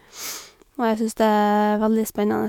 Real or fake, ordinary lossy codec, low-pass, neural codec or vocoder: fake; MP3, 96 kbps; 19.8 kHz; autoencoder, 48 kHz, 128 numbers a frame, DAC-VAE, trained on Japanese speech